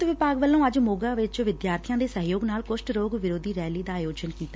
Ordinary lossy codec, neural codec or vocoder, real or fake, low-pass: none; none; real; none